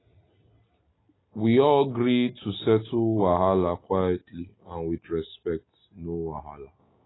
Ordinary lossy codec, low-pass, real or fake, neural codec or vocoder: AAC, 16 kbps; 7.2 kHz; real; none